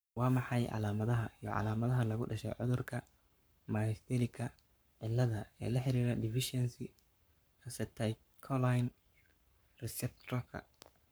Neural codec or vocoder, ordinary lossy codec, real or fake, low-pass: codec, 44.1 kHz, 7.8 kbps, Pupu-Codec; none; fake; none